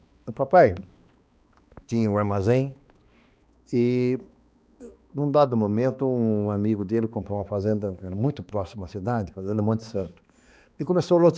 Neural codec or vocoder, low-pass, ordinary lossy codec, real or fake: codec, 16 kHz, 2 kbps, X-Codec, HuBERT features, trained on balanced general audio; none; none; fake